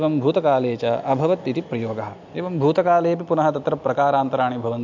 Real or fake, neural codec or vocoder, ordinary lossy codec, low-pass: real; none; MP3, 64 kbps; 7.2 kHz